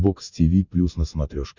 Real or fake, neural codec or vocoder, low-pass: real; none; 7.2 kHz